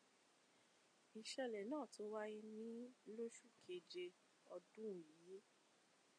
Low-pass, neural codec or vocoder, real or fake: 9.9 kHz; none; real